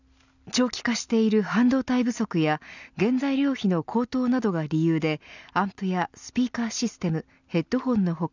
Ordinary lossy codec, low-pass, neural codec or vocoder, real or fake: none; 7.2 kHz; none; real